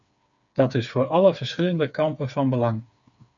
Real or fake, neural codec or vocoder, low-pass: fake; codec, 16 kHz, 4 kbps, FreqCodec, smaller model; 7.2 kHz